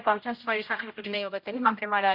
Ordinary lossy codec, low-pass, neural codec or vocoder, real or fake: none; 5.4 kHz; codec, 16 kHz, 0.5 kbps, X-Codec, HuBERT features, trained on general audio; fake